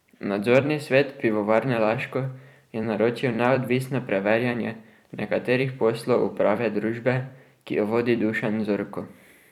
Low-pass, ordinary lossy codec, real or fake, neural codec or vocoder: 19.8 kHz; none; fake; vocoder, 44.1 kHz, 128 mel bands every 256 samples, BigVGAN v2